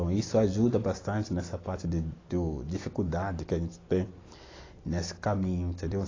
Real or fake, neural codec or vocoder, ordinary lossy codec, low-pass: real; none; AAC, 32 kbps; 7.2 kHz